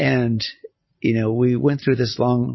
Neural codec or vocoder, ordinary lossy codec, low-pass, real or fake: none; MP3, 24 kbps; 7.2 kHz; real